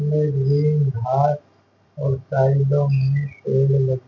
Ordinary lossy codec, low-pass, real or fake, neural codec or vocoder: none; none; real; none